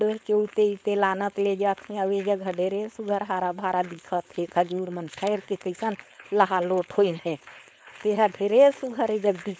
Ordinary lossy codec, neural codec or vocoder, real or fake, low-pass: none; codec, 16 kHz, 4.8 kbps, FACodec; fake; none